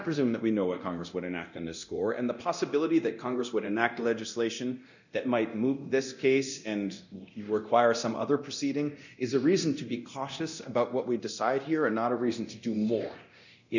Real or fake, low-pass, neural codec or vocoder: fake; 7.2 kHz; codec, 24 kHz, 0.9 kbps, DualCodec